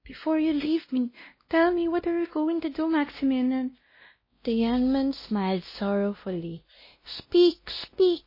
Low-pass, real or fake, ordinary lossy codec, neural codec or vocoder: 5.4 kHz; fake; MP3, 24 kbps; codec, 16 kHz, 1 kbps, X-Codec, WavLM features, trained on Multilingual LibriSpeech